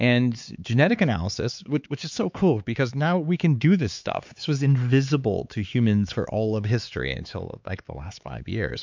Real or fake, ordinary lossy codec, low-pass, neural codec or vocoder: fake; MP3, 64 kbps; 7.2 kHz; codec, 16 kHz, 4 kbps, X-Codec, HuBERT features, trained on LibriSpeech